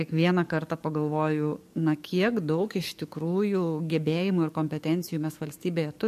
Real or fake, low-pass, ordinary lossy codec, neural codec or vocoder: fake; 14.4 kHz; MP3, 64 kbps; codec, 44.1 kHz, 7.8 kbps, DAC